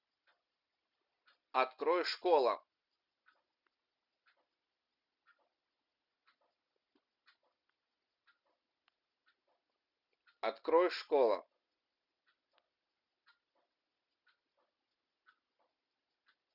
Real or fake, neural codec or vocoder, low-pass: real; none; 5.4 kHz